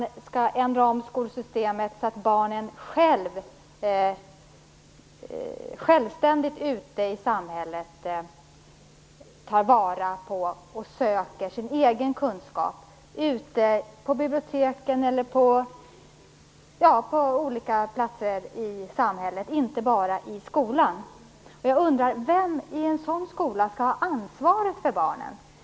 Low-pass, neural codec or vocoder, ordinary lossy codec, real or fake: none; none; none; real